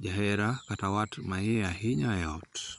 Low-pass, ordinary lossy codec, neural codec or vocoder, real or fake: 10.8 kHz; none; vocoder, 24 kHz, 100 mel bands, Vocos; fake